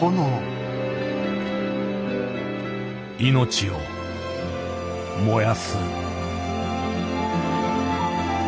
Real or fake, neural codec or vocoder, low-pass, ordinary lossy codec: real; none; none; none